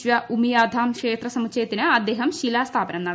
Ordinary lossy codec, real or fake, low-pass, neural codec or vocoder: none; real; none; none